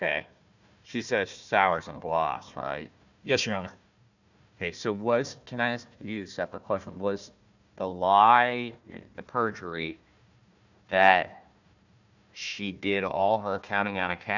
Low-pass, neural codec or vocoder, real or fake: 7.2 kHz; codec, 16 kHz, 1 kbps, FunCodec, trained on Chinese and English, 50 frames a second; fake